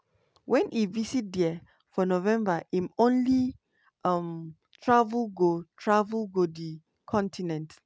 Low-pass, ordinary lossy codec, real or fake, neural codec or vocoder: none; none; real; none